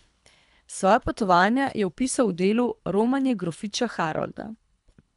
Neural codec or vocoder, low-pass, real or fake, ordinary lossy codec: codec, 24 kHz, 3 kbps, HILCodec; 10.8 kHz; fake; MP3, 96 kbps